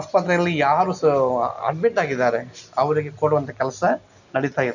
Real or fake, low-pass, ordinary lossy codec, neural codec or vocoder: real; 7.2 kHz; none; none